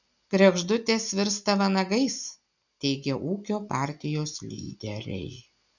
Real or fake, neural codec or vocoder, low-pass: real; none; 7.2 kHz